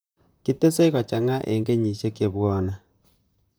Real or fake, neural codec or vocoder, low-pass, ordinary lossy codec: fake; vocoder, 44.1 kHz, 128 mel bands, Pupu-Vocoder; none; none